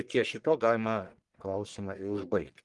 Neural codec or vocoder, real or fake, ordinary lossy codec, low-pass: codec, 44.1 kHz, 1.7 kbps, Pupu-Codec; fake; Opus, 24 kbps; 10.8 kHz